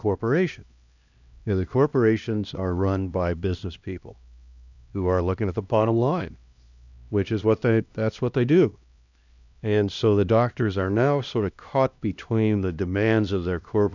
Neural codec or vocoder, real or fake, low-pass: codec, 16 kHz, 1 kbps, X-Codec, HuBERT features, trained on LibriSpeech; fake; 7.2 kHz